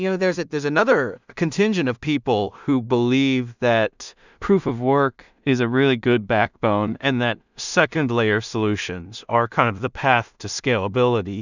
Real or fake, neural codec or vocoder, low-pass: fake; codec, 16 kHz in and 24 kHz out, 0.4 kbps, LongCat-Audio-Codec, two codebook decoder; 7.2 kHz